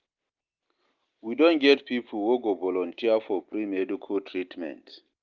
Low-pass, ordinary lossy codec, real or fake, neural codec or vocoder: 7.2 kHz; Opus, 32 kbps; real; none